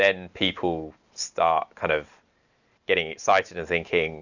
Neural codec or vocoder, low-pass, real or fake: none; 7.2 kHz; real